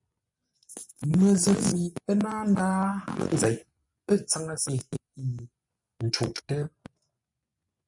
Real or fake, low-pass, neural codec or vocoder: fake; 10.8 kHz; vocoder, 24 kHz, 100 mel bands, Vocos